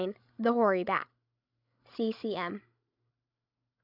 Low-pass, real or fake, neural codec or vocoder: 5.4 kHz; fake; codec, 16 kHz, 16 kbps, FreqCodec, larger model